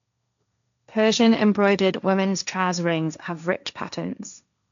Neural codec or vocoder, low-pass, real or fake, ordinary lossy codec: codec, 16 kHz, 1.1 kbps, Voila-Tokenizer; 7.2 kHz; fake; none